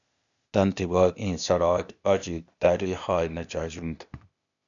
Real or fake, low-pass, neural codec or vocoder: fake; 7.2 kHz; codec, 16 kHz, 0.8 kbps, ZipCodec